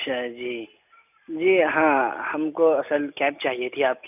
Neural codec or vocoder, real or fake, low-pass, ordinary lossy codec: none; real; 3.6 kHz; none